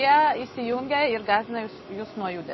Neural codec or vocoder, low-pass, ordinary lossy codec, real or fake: none; 7.2 kHz; MP3, 24 kbps; real